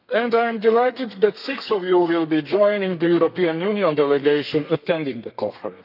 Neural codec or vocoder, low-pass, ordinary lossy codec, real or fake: codec, 32 kHz, 1.9 kbps, SNAC; 5.4 kHz; none; fake